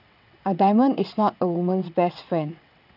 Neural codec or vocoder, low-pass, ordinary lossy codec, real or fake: vocoder, 22.05 kHz, 80 mel bands, WaveNeXt; 5.4 kHz; none; fake